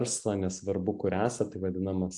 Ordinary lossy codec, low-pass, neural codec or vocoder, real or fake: AAC, 64 kbps; 10.8 kHz; none; real